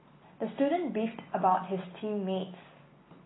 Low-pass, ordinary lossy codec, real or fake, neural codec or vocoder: 7.2 kHz; AAC, 16 kbps; real; none